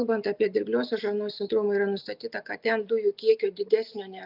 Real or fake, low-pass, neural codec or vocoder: real; 5.4 kHz; none